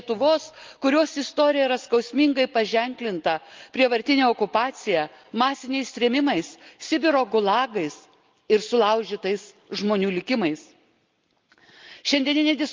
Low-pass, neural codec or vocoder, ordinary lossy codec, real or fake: 7.2 kHz; none; Opus, 24 kbps; real